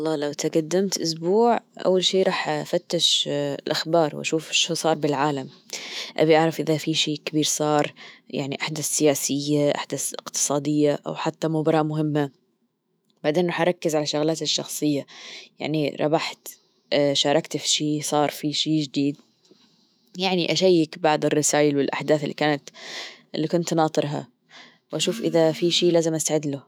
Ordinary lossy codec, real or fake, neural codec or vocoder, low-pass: none; fake; autoencoder, 48 kHz, 128 numbers a frame, DAC-VAE, trained on Japanese speech; none